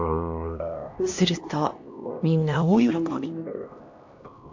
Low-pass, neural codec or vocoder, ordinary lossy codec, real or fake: 7.2 kHz; codec, 16 kHz, 1 kbps, X-Codec, HuBERT features, trained on LibriSpeech; AAC, 48 kbps; fake